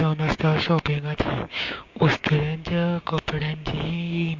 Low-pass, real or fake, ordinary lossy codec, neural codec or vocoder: 7.2 kHz; fake; MP3, 48 kbps; codec, 16 kHz, 6 kbps, DAC